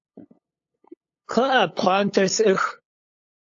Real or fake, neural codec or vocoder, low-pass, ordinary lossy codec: fake; codec, 16 kHz, 8 kbps, FunCodec, trained on LibriTTS, 25 frames a second; 7.2 kHz; AAC, 48 kbps